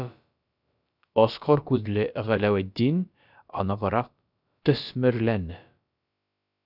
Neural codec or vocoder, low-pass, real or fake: codec, 16 kHz, about 1 kbps, DyCAST, with the encoder's durations; 5.4 kHz; fake